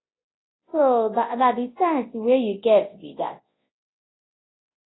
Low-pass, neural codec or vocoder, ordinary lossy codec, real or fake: 7.2 kHz; codec, 24 kHz, 0.9 kbps, WavTokenizer, large speech release; AAC, 16 kbps; fake